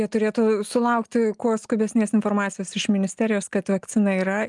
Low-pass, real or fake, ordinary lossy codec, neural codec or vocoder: 10.8 kHz; real; Opus, 32 kbps; none